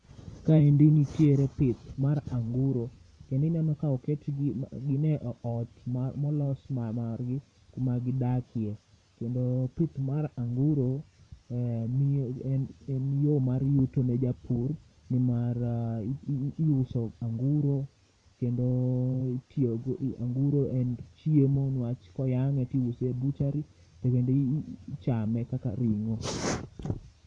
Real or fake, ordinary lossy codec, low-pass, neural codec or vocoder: fake; none; 9.9 kHz; vocoder, 44.1 kHz, 128 mel bands every 512 samples, BigVGAN v2